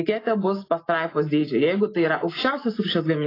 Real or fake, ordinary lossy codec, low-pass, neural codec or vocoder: real; AAC, 24 kbps; 5.4 kHz; none